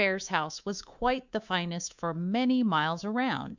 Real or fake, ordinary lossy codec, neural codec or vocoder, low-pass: fake; Opus, 64 kbps; codec, 24 kHz, 3.1 kbps, DualCodec; 7.2 kHz